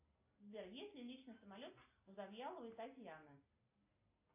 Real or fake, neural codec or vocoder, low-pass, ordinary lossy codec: real; none; 3.6 kHz; AAC, 32 kbps